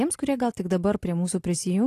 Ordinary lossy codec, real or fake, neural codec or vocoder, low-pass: AAC, 48 kbps; real; none; 14.4 kHz